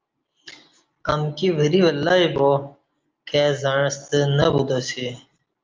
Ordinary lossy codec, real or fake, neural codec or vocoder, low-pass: Opus, 24 kbps; real; none; 7.2 kHz